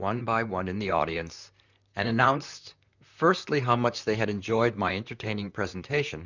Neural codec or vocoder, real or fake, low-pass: vocoder, 44.1 kHz, 128 mel bands, Pupu-Vocoder; fake; 7.2 kHz